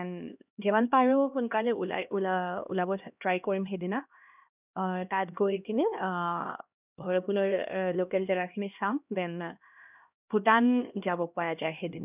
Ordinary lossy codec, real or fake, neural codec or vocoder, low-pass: none; fake; codec, 16 kHz, 1 kbps, X-Codec, HuBERT features, trained on LibriSpeech; 3.6 kHz